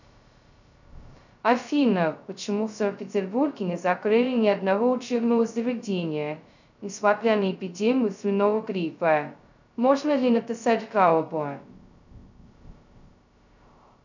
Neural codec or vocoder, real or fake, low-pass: codec, 16 kHz, 0.2 kbps, FocalCodec; fake; 7.2 kHz